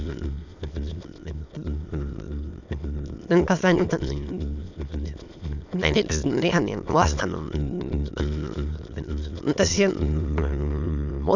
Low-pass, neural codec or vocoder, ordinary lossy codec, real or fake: 7.2 kHz; autoencoder, 22.05 kHz, a latent of 192 numbers a frame, VITS, trained on many speakers; none; fake